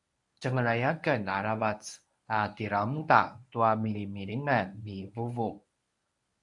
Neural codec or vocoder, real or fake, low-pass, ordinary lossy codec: codec, 24 kHz, 0.9 kbps, WavTokenizer, medium speech release version 1; fake; 10.8 kHz; MP3, 96 kbps